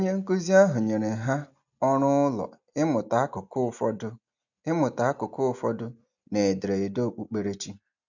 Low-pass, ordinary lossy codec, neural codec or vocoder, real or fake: 7.2 kHz; none; none; real